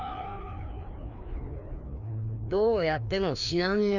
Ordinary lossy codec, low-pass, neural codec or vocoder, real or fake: none; 7.2 kHz; codec, 16 kHz, 2 kbps, FreqCodec, larger model; fake